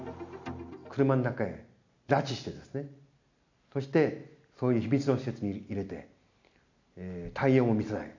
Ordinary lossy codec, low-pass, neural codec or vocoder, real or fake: none; 7.2 kHz; none; real